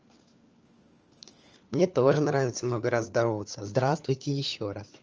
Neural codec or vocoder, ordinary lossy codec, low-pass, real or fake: codec, 16 kHz, 4 kbps, FunCodec, trained on LibriTTS, 50 frames a second; Opus, 24 kbps; 7.2 kHz; fake